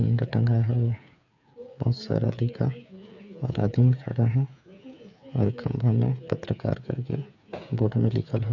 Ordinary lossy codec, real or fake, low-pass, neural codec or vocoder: none; fake; 7.2 kHz; codec, 16 kHz, 8 kbps, FreqCodec, smaller model